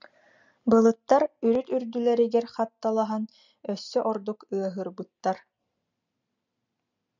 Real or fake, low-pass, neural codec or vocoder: real; 7.2 kHz; none